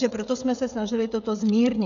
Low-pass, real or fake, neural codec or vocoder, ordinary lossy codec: 7.2 kHz; fake; codec, 16 kHz, 16 kbps, FreqCodec, smaller model; MP3, 96 kbps